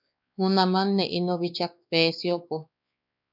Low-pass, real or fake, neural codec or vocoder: 5.4 kHz; fake; codec, 16 kHz, 2 kbps, X-Codec, WavLM features, trained on Multilingual LibriSpeech